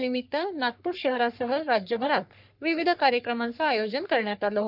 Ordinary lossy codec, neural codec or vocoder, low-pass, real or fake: none; codec, 44.1 kHz, 3.4 kbps, Pupu-Codec; 5.4 kHz; fake